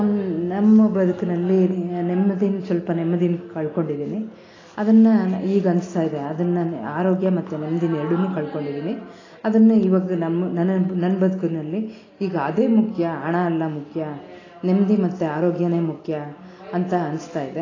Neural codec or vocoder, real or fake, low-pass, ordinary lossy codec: none; real; 7.2 kHz; AAC, 32 kbps